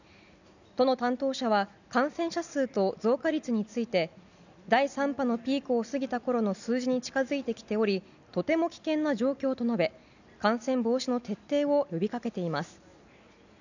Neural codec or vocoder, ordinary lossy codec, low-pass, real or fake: none; none; 7.2 kHz; real